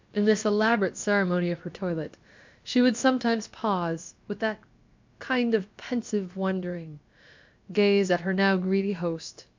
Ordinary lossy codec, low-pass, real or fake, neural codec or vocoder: MP3, 64 kbps; 7.2 kHz; fake; codec, 16 kHz, about 1 kbps, DyCAST, with the encoder's durations